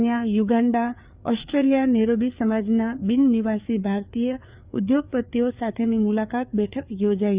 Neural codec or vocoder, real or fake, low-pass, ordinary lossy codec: codec, 16 kHz, 4 kbps, FreqCodec, larger model; fake; 3.6 kHz; Opus, 64 kbps